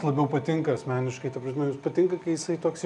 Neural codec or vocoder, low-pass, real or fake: none; 10.8 kHz; real